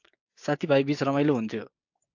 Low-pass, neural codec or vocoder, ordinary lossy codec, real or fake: 7.2 kHz; codec, 16 kHz, 6 kbps, DAC; AAC, 48 kbps; fake